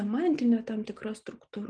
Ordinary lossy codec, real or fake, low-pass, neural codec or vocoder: Opus, 32 kbps; real; 9.9 kHz; none